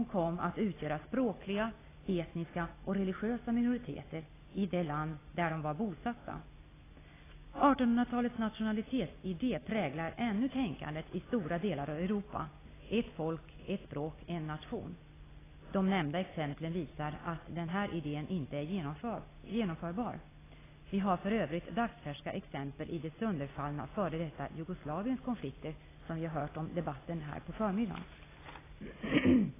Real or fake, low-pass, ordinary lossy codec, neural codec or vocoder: real; 3.6 kHz; AAC, 16 kbps; none